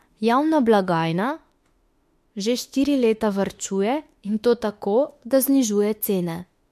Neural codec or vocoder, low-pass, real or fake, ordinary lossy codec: autoencoder, 48 kHz, 32 numbers a frame, DAC-VAE, trained on Japanese speech; 14.4 kHz; fake; MP3, 64 kbps